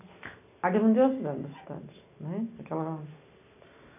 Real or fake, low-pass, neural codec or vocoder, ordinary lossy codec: real; 3.6 kHz; none; none